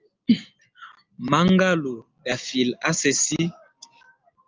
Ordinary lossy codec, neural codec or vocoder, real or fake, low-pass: Opus, 24 kbps; none; real; 7.2 kHz